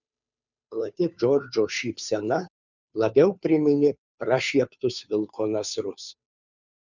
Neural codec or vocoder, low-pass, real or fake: codec, 16 kHz, 2 kbps, FunCodec, trained on Chinese and English, 25 frames a second; 7.2 kHz; fake